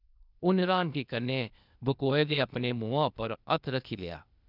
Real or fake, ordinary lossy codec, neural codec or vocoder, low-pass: fake; none; codec, 16 kHz, 0.8 kbps, ZipCodec; 5.4 kHz